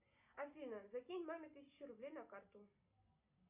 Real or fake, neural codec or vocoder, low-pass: real; none; 3.6 kHz